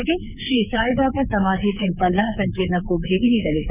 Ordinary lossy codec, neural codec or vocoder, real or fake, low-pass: none; codec, 24 kHz, 3.1 kbps, DualCodec; fake; 3.6 kHz